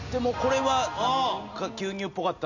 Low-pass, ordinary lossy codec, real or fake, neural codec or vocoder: 7.2 kHz; none; real; none